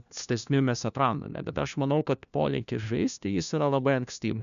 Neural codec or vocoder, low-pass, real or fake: codec, 16 kHz, 1 kbps, FunCodec, trained on LibriTTS, 50 frames a second; 7.2 kHz; fake